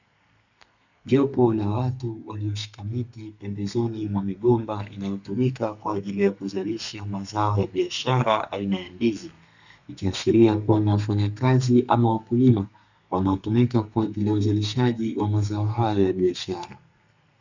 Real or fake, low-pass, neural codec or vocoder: fake; 7.2 kHz; codec, 32 kHz, 1.9 kbps, SNAC